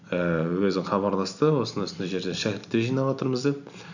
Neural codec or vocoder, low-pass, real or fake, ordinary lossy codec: none; 7.2 kHz; real; none